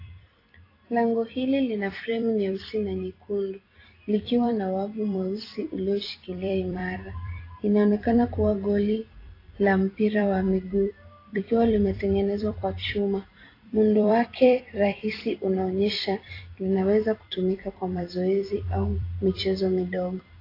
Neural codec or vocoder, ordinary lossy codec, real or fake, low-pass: vocoder, 44.1 kHz, 128 mel bands every 256 samples, BigVGAN v2; AAC, 24 kbps; fake; 5.4 kHz